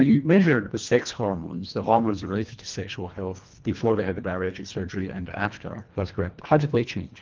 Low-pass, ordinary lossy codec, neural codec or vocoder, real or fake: 7.2 kHz; Opus, 24 kbps; codec, 24 kHz, 1.5 kbps, HILCodec; fake